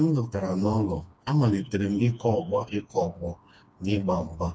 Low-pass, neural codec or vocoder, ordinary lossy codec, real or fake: none; codec, 16 kHz, 2 kbps, FreqCodec, smaller model; none; fake